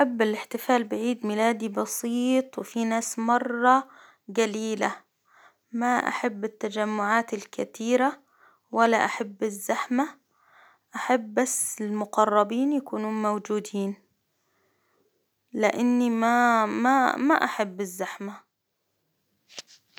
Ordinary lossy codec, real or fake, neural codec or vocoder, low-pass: none; real; none; none